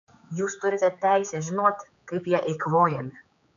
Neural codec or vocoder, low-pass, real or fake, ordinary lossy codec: codec, 16 kHz, 4 kbps, X-Codec, HuBERT features, trained on general audio; 7.2 kHz; fake; AAC, 96 kbps